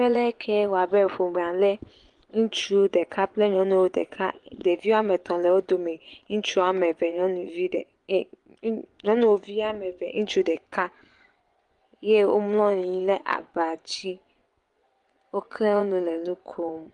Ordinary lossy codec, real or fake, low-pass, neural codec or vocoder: Opus, 24 kbps; fake; 10.8 kHz; vocoder, 24 kHz, 100 mel bands, Vocos